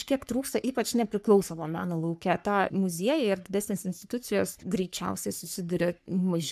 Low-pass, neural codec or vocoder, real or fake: 14.4 kHz; codec, 44.1 kHz, 3.4 kbps, Pupu-Codec; fake